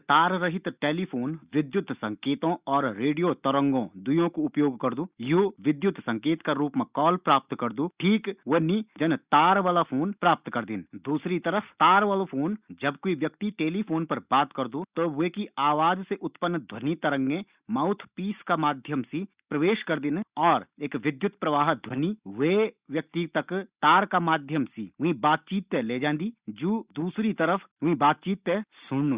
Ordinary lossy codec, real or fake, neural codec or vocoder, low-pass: Opus, 64 kbps; real; none; 3.6 kHz